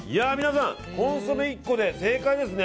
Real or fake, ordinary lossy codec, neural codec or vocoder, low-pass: real; none; none; none